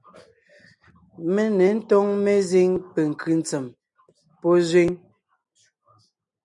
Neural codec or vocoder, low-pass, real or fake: none; 10.8 kHz; real